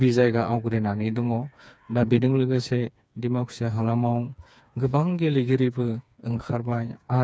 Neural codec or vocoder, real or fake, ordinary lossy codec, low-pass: codec, 16 kHz, 4 kbps, FreqCodec, smaller model; fake; none; none